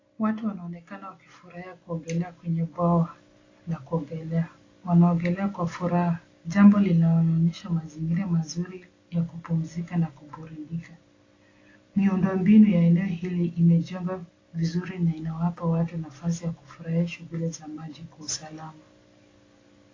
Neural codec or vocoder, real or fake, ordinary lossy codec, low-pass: none; real; AAC, 32 kbps; 7.2 kHz